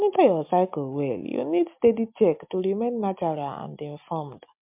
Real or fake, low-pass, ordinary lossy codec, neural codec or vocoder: real; 3.6 kHz; MP3, 32 kbps; none